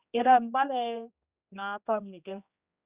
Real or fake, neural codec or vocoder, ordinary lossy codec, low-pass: fake; codec, 16 kHz, 1 kbps, X-Codec, HuBERT features, trained on general audio; Opus, 64 kbps; 3.6 kHz